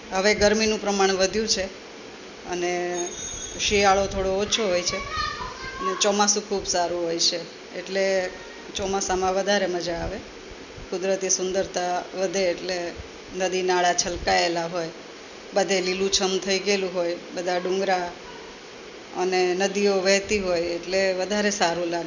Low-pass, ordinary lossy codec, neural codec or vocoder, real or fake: 7.2 kHz; none; none; real